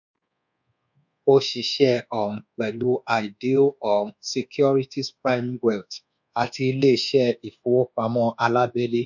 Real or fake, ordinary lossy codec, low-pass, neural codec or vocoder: fake; none; 7.2 kHz; codec, 24 kHz, 1.2 kbps, DualCodec